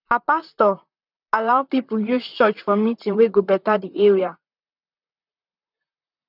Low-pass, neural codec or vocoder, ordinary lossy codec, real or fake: 5.4 kHz; vocoder, 44.1 kHz, 128 mel bands, Pupu-Vocoder; none; fake